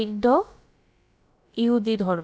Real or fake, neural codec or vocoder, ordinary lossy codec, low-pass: fake; codec, 16 kHz, about 1 kbps, DyCAST, with the encoder's durations; none; none